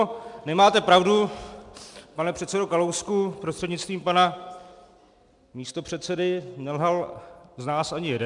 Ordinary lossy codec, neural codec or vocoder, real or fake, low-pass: MP3, 96 kbps; none; real; 10.8 kHz